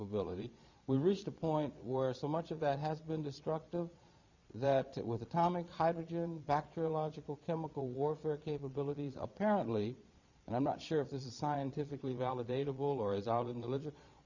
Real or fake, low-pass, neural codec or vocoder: fake; 7.2 kHz; vocoder, 22.05 kHz, 80 mel bands, Vocos